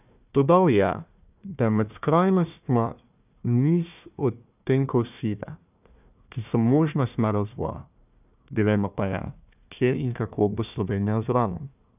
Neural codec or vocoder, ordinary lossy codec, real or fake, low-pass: codec, 16 kHz, 1 kbps, FunCodec, trained on Chinese and English, 50 frames a second; none; fake; 3.6 kHz